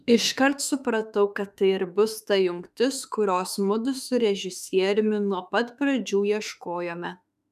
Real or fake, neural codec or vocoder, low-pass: fake; autoencoder, 48 kHz, 32 numbers a frame, DAC-VAE, trained on Japanese speech; 14.4 kHz